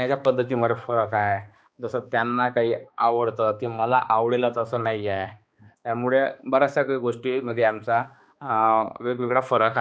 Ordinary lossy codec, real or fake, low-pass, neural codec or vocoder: none; fake; none; codec, 16 kHz, 2 kbps, X-Codec, HuBERT features, trained on balanced general audio